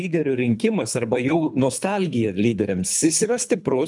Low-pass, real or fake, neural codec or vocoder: 10.8 kHz; fake; codec, 24 kHz, 3 kbps, HILCodec